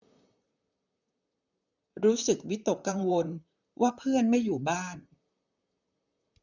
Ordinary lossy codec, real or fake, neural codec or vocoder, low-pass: none; fake; vocoder, 44.1 kHz, 128 mel bands, Pupu-Vocoder; 7.2 kHz